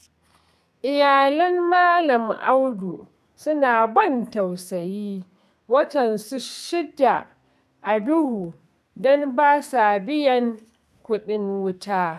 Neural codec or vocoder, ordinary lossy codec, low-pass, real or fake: codec, 32 kHz, 1.9 kbps, SNAC; none; 14.4 kHz; fake